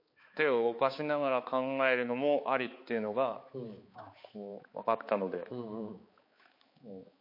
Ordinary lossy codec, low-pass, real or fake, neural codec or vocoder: MP3, 32 kbps; 5.4 kHz; fake; codec, 16 kHz, 4 kbps, X-Codec, HuBERT features, trained on balanced general audio